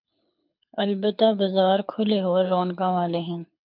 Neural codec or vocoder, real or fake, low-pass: codec, 24 kHz, 6 kbps, HILCodec; fake; 5.4 kHz